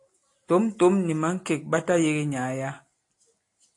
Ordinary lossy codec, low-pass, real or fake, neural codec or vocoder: AAC, 48 kbps; 10.8 kHz; real; none